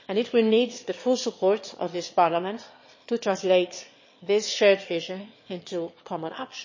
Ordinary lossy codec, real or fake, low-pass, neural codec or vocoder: MP3, 32 kbps; fake; 7.2 kHz; autoencoder, 22.05 kHz, a latent of 192 numbers a frame, VITS, trained on one speaker